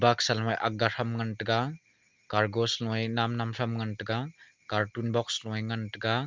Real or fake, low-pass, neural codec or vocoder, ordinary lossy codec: real; 7.2 kHz; none; Opus, 32 kbps